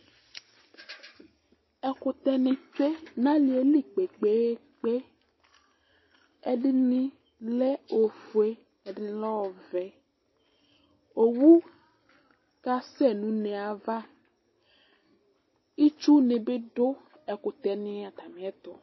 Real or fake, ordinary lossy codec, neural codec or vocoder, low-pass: real; MP3, 24 kbps; none; 7.2 kHz